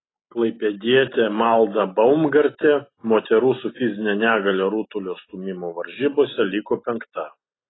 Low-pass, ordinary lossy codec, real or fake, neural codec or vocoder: 7.2 kHz; AAC, 16 kbps; real; none